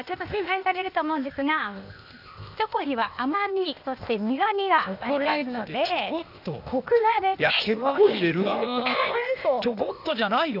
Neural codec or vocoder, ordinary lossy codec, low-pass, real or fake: codec, 16 kHz, 0.8 kbps, ZipCodec; none; 5.4 kHz; fake